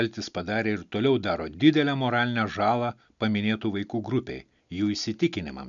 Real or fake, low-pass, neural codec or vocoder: real; 7.2 kHz; none